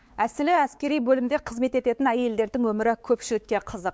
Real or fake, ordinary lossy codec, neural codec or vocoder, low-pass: fake; none; codec, 16 kHz, 4 kbps, X-Codec, WavLM features, trained on Multilingual LibriSpeech; none